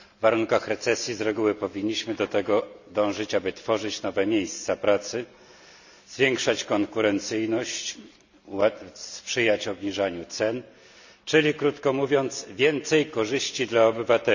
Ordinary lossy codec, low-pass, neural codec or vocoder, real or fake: none; 7.2 kHz; none; real